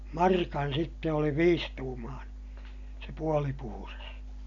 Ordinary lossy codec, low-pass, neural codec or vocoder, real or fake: AAC, 64 kbps; 7.2 kHz; none; real